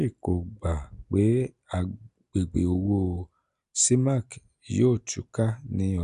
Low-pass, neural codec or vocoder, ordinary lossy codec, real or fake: 10.8 kHz; none; none; real